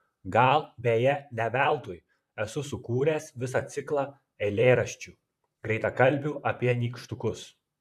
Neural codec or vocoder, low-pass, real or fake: vocoder, 44.1 kHz, 128 mel bands, Pupu-Vocoder; 14.4 kHz; fake